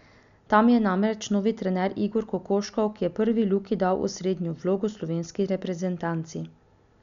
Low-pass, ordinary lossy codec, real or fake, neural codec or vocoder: 7.2 kHz; none; real; none